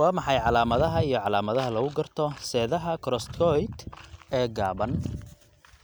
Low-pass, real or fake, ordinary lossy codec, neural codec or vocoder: none; real; none; none